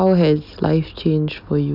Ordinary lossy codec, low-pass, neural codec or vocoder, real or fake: none; 5.4 kHz; none; real